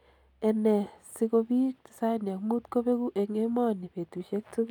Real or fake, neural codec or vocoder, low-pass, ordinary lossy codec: real; none; 19.8 kHz; none